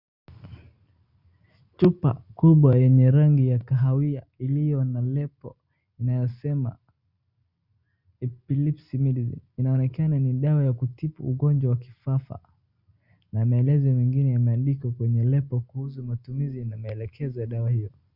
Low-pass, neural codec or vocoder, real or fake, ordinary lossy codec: 5.4 kHz; none; real; Opus, 64 kbps